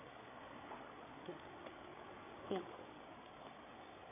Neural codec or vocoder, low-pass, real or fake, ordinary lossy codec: codec, 16 kHz, 8 kbps, FreqCodec, larger model; 3.6 kHz; fake; AAC, 16 kbps